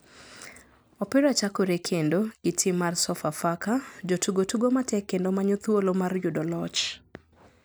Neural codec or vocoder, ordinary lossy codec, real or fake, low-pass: none; none; real; none